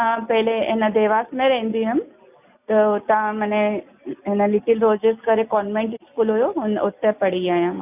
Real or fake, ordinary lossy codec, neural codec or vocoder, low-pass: real; none; none; 3.6 kHz